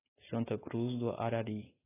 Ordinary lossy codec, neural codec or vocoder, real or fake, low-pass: AAC, 16 kbps; autoencoder, 48 kHz, 128 numbers a frame, DAC-VAE, trained on Japanese speech; fake; 3.6 kHz